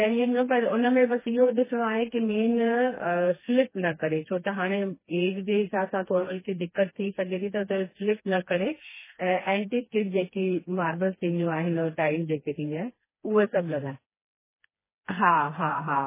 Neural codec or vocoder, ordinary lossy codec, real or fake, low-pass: codec, 16 kHz, 2 kbps, FreqCodec, smaller model; MP3, 16 kbps; fake; 3.6 kHz